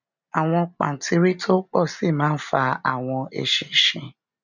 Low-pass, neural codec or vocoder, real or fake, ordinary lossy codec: 7.2 kHz; none; real; none